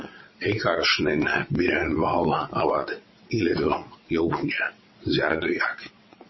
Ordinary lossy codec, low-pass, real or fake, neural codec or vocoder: MP3, 24 kbps; 7.2 kHz; fake; vocoder, 22.05 kHz, 80 mel bands, WaveNeXt